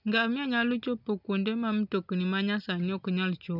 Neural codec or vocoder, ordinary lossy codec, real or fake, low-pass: none; none; real; 5.4 kHz